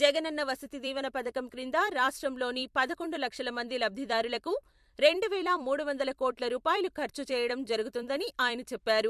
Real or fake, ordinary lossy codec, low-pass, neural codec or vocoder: fake; MP3, 64 kbps; 14.4 kHz; vocoder, 44.1 kHz, 128 mel bands every 256 samples, BigVGAN v2